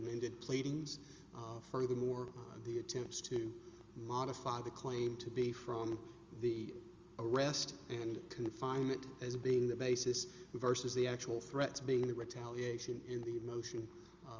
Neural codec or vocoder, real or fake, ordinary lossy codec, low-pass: none; real; Opus, 32 kbps; 7.2 kHz